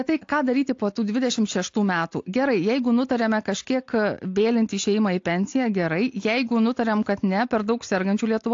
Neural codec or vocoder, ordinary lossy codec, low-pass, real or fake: none; AAC, 48 kbps; 7.2 kHz; real